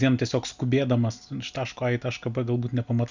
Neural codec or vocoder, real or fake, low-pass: none; real; 7.2 kHz